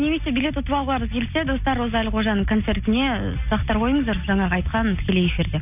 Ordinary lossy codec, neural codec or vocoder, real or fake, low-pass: none; none; real; 3.6 kHz